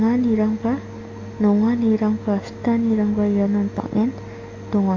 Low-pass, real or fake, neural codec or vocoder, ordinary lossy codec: 7.2 kHz; real; none; AAC, 48 kbps